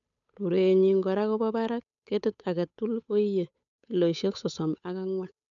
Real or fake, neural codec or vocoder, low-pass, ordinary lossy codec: fake; codec, 16 kHz, 8 kbps, FunCodec, trained on Chinese and English, 25 frames a second; 7.2 kHz; none